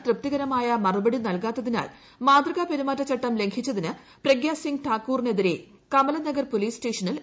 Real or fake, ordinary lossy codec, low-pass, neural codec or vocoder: real; none; none; none